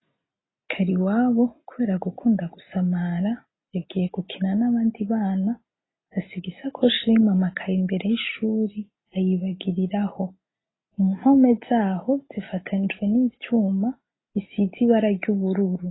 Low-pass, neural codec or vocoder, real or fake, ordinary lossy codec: 7.2 kHz; none; real; AAC, 16 kbps